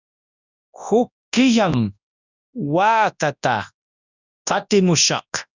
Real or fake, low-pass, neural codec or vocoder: fake; 7.2 kHz; codec, 24 kHz, 0.9 kbps, WavTokenizer, large speech release